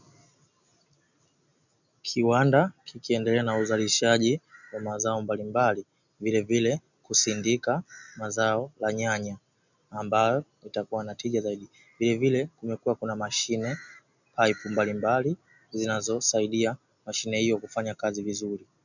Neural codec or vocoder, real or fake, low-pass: none; real; 7.2 kHz